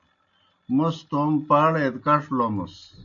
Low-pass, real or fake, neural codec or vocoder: 7.2 kHz; real; none